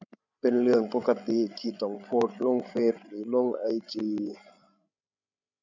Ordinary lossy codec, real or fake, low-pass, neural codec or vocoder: none; fake; 7.2 kHz; codec, 16 kHz, 16 kbps, FreqCodec, larger model